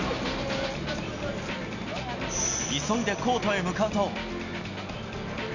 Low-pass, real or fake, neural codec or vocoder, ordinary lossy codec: 7.2 kHz; real; none; none